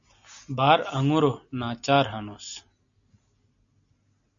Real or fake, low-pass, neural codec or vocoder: real; 7.2 kHz; none